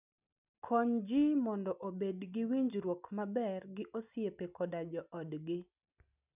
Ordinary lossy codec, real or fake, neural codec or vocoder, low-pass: none; real; none; 3.6 kHz